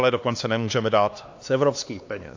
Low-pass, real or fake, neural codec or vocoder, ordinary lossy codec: 7.2 kHz; fake; codec, 16 kHz, 2 kbps, X-Codec, HuBERT features, trained on LibriSpeech; AAC, 48 kbps